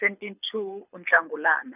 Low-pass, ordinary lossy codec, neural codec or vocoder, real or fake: 3.6 kHz; none; codec, 24 kHz, 6 kbps, HILCodec; fake